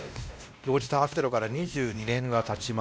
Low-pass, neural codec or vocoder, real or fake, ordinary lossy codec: none; codec, 16 kHz, 1 kbps, X-Codec, WavLM features, trained on Multilingual LibriSpeech; fake; none